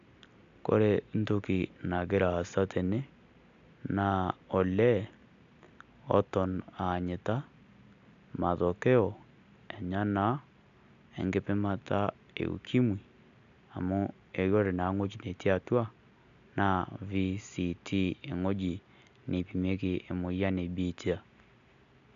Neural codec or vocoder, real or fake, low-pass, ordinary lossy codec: none; real; 7.2 kHz; AAC, 96 kbps